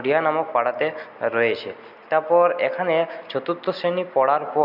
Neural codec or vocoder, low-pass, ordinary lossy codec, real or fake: none; 5.4 kHz; none; real